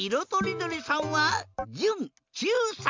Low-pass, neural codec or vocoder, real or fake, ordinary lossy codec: 7.2 kHz; none; real; MP3, 64 kbps